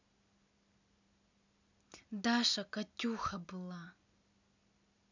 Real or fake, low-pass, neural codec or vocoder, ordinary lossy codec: real; 7.2 kHz; none; none